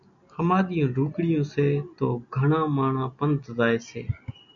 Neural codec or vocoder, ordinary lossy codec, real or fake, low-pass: none; MP3, 96 kbps; real; 7.2 kHz